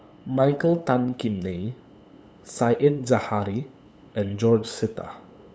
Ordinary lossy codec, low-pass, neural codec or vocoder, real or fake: none; none; codec, 16 kHz, 8 kbps, FunCodec, trained on LibriTTS, 25 frames a second; fake